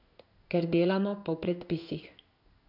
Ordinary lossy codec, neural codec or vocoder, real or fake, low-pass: none; codec, 16 kHz in and 24 kHz out, 1 kbps, XY-Tokenizer; fake; 5.4 kHz